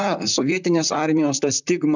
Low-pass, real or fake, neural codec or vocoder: 7.2 kHz; fake; vocoder, 44.1 kHz, 128 mel bands, Pupu-Vocoder